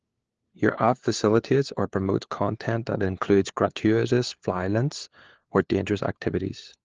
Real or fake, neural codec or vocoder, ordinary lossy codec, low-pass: fake; codec, 16 kHz, 4 kbps, FunCodec, trained on LibriTTS, 50 frames a second; Opus, 16 kbps; 7.2 kHz